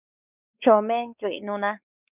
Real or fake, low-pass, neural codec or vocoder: fake; 3.6 kHz; codec, 16 kHz, 4 kbps, X-Codec, WavLM features, trained on Multilingual LibriSpeech